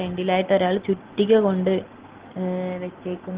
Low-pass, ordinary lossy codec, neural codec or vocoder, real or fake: 3.6 kHz; Opus, 16 kbps; none; real